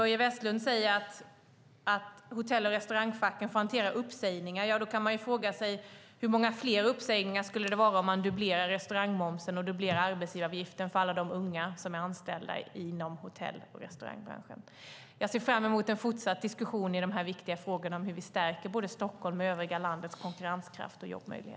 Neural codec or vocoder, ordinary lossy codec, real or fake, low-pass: none; none; real; none